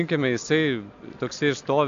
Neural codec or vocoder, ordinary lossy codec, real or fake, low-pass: none; MP3, 64 kbps; real; 7.2 kHz